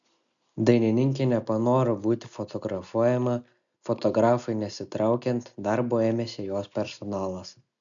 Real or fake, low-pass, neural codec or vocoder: real; 7.2 kHz; none